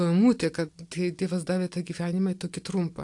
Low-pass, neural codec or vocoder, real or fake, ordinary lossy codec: 10.8 kHz; none; real; AAC, 64 kbps